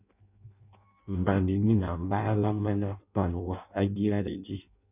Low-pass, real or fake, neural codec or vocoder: 3.6 kHz; fake; codec, 16 kHz in and 24 kHz out, 0.6 kbps, FireRedTTS-2 codec